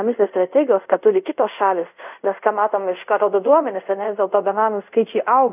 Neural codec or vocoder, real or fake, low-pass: codec, 24 kHz, 0.5 kbps, DualCodec; fake; 3.6 kHz